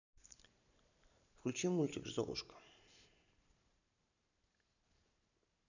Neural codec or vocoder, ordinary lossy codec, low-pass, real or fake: vocoder, 44.1 kHz, 128 mel bands every 512 samples, BigVGAN v2; none; 7.2 kHz; fake